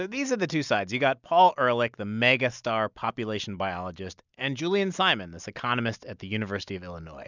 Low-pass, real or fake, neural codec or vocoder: 7.2 kHz; real; none